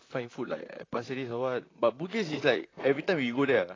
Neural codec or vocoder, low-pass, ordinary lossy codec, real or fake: vocoder, 44.1 kHz, 128 mel bands, Pupu-Vocoder; 7.2 kHz; AAC, 32 kbps; fake